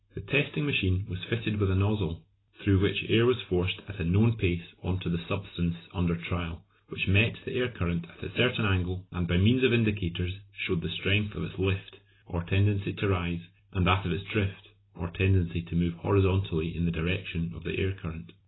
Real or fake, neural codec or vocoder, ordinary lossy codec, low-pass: real; none; AAC, 16 kbps; 7.2 kHz